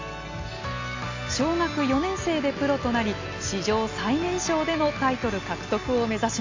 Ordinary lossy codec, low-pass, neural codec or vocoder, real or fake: AAC, 48 kbps; 7.2 kHz; none; real